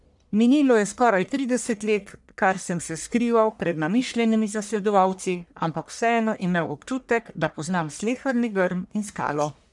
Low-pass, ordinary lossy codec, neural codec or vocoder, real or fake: 10.8 kHz; none; codec, 44.1 kHz, 1.7 kbps, Pupu-Codec; fake